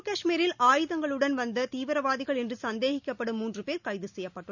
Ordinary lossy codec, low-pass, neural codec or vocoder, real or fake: none; 7.2 kHz; none; real